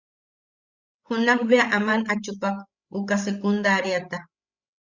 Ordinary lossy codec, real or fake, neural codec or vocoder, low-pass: Opus, 64 kbps; fake; codec, 16 kHz, 16 kbps, FreqCodec, larger model; 7.2 kHz